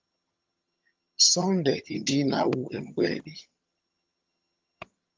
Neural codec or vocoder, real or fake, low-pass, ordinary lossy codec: vocoder, 22.05 kHz, 80 mel bands, HiFi-GAN; fake; 7.2 kHz; Opus, 24 kbps